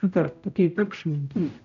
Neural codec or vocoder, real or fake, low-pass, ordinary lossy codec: codec, 16 kHz, 0.5 kbps, X-Codec, HuBERT features, trained on general audio; fake; 7.2 kHz; none